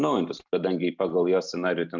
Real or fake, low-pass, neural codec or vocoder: real; 7.2 kHz; none